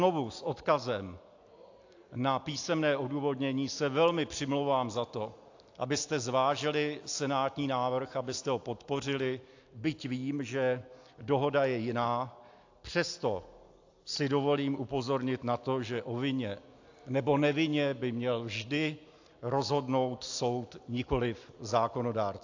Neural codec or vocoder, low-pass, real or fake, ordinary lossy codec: none; 7.2 kHz; real; AAC, 48 kbps